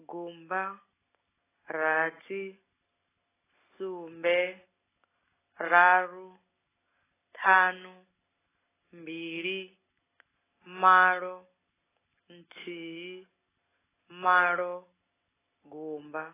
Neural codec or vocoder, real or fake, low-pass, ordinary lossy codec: none; real; 3.6 kHz; AAC, 16 kbps